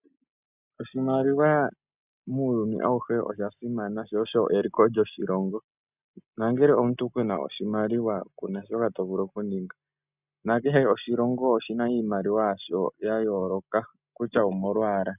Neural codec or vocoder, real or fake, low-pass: none; real; 3.6 kHz